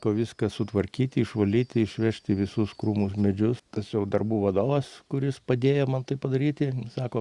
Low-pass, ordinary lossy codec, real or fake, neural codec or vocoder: 10.8 kHz; Opus, 64 kbps; real; none